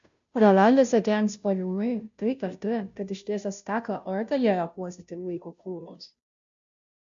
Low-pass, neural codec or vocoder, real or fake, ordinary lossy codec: 7.2 kHz; codec, 16 kHz, 0.5 kbps, FunCodec, trained on Chinese and English, 25 frames a second; fake; MP3, 64 kbps